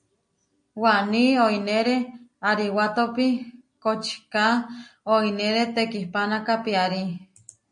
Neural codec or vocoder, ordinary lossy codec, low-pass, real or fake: none; MP3, 48 kbps; 9.9 kHz; real